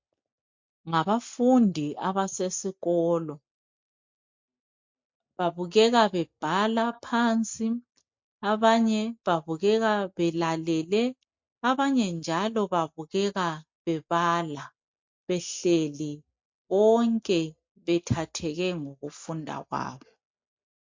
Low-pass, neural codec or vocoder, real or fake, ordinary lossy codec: 7.2 kHz; none; real; MP3, 48 kbps